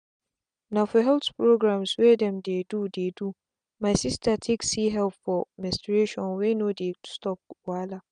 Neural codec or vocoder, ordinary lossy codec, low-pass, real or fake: none; none; 9.9 kHz; real